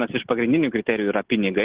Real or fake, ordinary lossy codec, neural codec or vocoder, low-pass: real; Opus, 16 kbps; none; 3.6 kHz